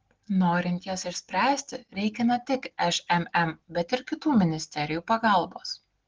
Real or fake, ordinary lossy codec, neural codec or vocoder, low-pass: real; Opus, 16 kbps; none; 7.2 kHz